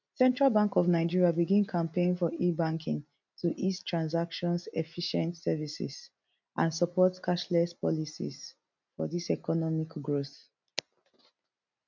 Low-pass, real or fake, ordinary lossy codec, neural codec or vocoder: 7.2 kHz; real; none; none